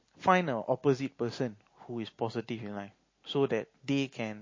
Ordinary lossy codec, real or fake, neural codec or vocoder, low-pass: MP3, 32 kbps; real; none; 7.2 kHz